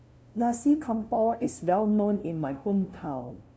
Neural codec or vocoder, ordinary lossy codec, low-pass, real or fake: codec, 16 kHz, 0.5 kbps, FunCodec, trained on LibriTTS, 25 frames a second; none; none; fake